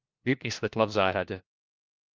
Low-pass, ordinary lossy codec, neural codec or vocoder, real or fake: 7.2 kHz; Opus, 24 kbps; codec, 16 kHz, 1 kbps, FunCodec, trained on LibriTTS, 50 frames a second; fake